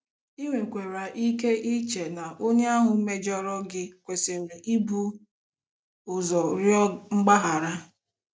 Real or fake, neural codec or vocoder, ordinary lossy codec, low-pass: real; none; none; none